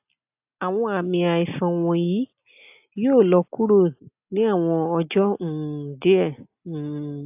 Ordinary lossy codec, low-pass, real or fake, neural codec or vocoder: AAC, 32 kbps; 3.6 kHz; real; none